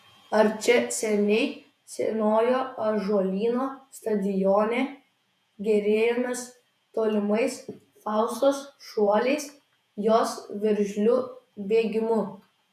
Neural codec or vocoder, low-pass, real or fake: vocoder, 48 kHz, 128 mel bands, Vocos; 14.4 kHz; fake